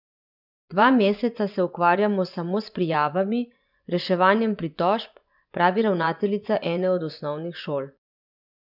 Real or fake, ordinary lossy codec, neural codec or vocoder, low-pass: fake; none; vocoder, 24 kHz, 100 mel bands, Vocos; 5.4 kHz